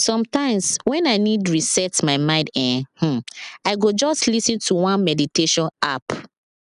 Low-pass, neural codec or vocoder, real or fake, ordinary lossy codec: 10.8 kHz; none; real; none